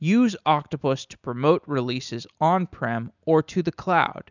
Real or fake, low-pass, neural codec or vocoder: real; 7.2 kHz; none